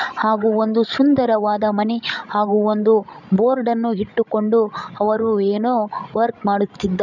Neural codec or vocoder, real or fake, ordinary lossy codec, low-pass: codec, 16 kHz, 16 kbps, FreqCodec, larger model; fake; none; 7.2 kHz